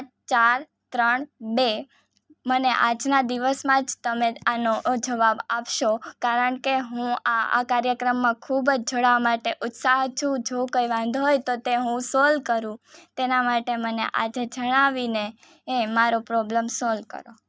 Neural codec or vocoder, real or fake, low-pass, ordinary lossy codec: none; real; none; none